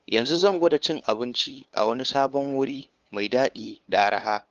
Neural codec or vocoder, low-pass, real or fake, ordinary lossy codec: codec, 16 kHz, 2 kbps, FunCodec, trained on LibriTTS, 25 frames a second; 7.2 kHz; fake; Opus, 32 kbps